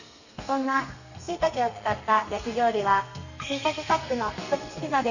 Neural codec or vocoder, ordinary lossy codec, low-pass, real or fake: codec, 32 kHz, 1.9 kbps, SNAC; none; 7.2 kHz; fake